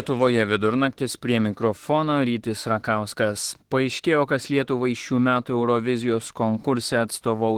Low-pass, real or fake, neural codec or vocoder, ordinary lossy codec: 19.8 kHz; fake; autoencoder, 48 kHz, 32 numbers a frame, DAC-VAE, trained on Japanese speech; Opus, 16 kbps